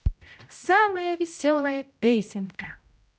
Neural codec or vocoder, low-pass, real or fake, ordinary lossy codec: codec, 16 kHz, 0.5 kbps, X-Codec, HuBERT features, trained on general audio; none; fake; none